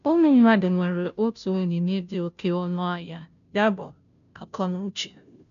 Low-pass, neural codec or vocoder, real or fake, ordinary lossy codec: 7.2 kHz; codec, 16 kHz, 0.5 kbps, FunCodec, trained on Chinese and English, 25 frames a second; fake; none